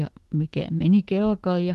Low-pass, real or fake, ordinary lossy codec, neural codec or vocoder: 14.4 kHz; fake; Opus, 16 kbps; autoencoder, 48 kHz, 128 numbers a frame, DAC-VAE, trained on Japanese speech